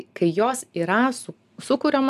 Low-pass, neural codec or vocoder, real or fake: 14.4 kHz; none; real